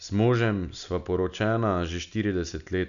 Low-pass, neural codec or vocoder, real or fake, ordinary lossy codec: 7.2 kHz; none; real; none